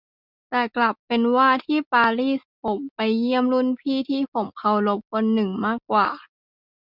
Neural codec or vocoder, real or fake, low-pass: none; real; 5.4 kHz